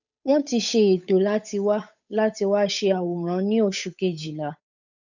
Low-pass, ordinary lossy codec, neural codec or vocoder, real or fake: 7.2 kHz; none; codec, 16 kHz, 8 kbps, FunCodec, trained on Chinese and English, 25 frames a second; fake